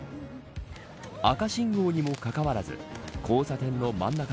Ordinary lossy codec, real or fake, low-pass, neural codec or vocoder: none; real; none; none